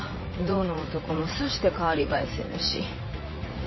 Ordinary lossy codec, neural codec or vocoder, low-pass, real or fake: MP3, 24 kbps; vocoder, 44.1 kHz, 80 mel bands, Vocos; 7.2 kHz; fake